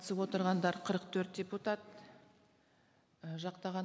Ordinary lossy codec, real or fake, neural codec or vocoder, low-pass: none; real; none; none